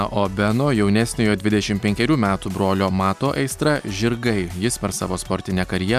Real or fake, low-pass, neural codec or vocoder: real; 14.4 kHz; none